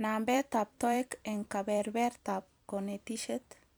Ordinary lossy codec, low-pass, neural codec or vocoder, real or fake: none; none; vocoder, 44.1 kHz, 128 mel bands every 256 samples, BigVGAN v2; fake